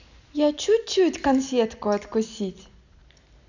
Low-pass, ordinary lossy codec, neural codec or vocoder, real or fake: 7.2 kHz; none; none; real